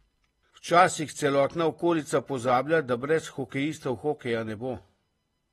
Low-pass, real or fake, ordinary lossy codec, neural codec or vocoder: 19.8 kHz; real; AAC, 32 kbps; none